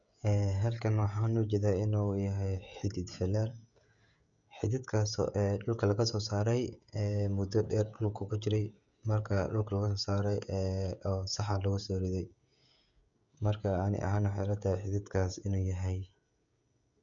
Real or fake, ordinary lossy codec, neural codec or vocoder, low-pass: fake; none; codec, 16 kHz, 16 kbps, FreqCodec, smaller model; 7.2 kHz